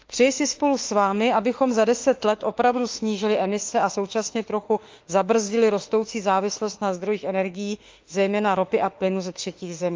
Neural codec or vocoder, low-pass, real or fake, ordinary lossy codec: autoencoder, 48 kHz, 32 numbers a frame, DAC-VAE, trained on Japanese speech; 7.2 kHz; fake; Opus, 32 kbps